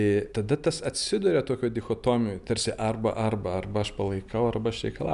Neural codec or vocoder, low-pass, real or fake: none; 10.8 kHz; real